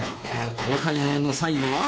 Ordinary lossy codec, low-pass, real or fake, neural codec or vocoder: none; none; fake; codec, 16 kHz, 2 kbps, X-Codec, WavLM features, trained on Multilingual LibriSpeech